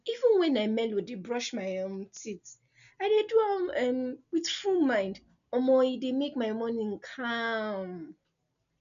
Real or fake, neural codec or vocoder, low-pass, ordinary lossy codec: real; none; 7.2 kHz; none